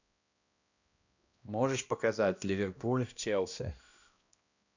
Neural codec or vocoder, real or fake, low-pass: codec, 16 kHz, 1 kbps, X-Codec, HuBERT features, trained on balanced general audio; fake; 7.2 kHz